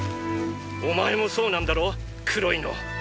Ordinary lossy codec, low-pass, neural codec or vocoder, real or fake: none; none; none; real